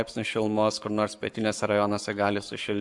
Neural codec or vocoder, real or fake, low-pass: codec, 44.1 kHz, 7.8 kbps, Pupu-Codec; fake; 10.8 kHz